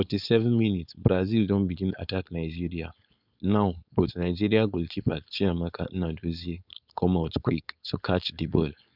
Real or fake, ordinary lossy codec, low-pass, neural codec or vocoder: fake; none; 5.4 kHz; codec, 16 kHz, 4.8 kbps, FACodec